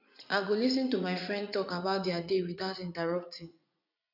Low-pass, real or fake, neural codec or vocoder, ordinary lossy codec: 5.4 kHz; fake; vocoder, 44.1 kHz, 80 mel bands, Vocos; none